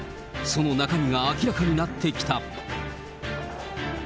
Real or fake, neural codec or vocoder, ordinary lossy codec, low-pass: real; none; none; none